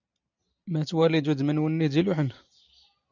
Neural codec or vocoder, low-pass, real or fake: none; 7.2 kHz; real